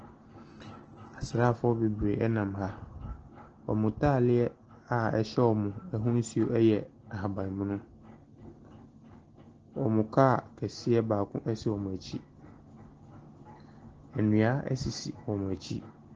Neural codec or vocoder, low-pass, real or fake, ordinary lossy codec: none; 7.2 kHz; real; Opus, 16 kbps